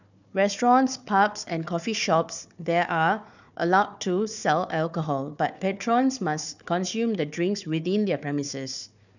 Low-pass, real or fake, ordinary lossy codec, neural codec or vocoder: 7.2 kHz; fake; none; codec, 16 kHz, 4 kbps, FunCodec, trained on Chinese and English, 50 frames a second